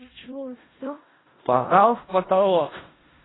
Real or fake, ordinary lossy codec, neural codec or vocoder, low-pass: fake; AAC, 16 kbps; codec, 16 kHz in and 24 kHz out, 0.4 kbps, LongCat-Audio-Codec, four codebook decoder; 7.2 kHz